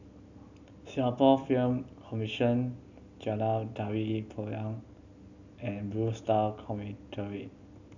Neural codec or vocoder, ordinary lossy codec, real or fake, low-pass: none; none; real; 7.2 kHz